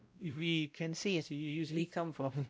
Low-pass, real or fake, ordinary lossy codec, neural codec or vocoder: none; fake; none; codec, 16 kHz, 0.5 kbps, X-Codec, WavLM features, trained on Multilingual LibriSpeech